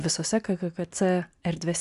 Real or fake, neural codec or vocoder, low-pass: real; none; 10.8 kHz